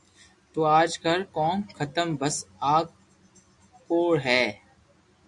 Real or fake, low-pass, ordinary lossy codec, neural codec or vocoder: real; 10.8 kHz; AAC, 64 kbps; none